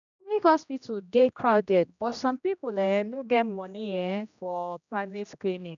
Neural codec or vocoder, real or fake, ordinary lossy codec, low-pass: codec, 16 kHz, 1 kbps, X-Codec, HuBERT features, trained on general audio; fake; none; 7.2 kHz